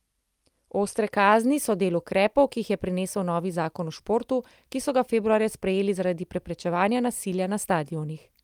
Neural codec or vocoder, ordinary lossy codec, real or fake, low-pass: none; Opus, 32 kbps; real; 19.8 kHz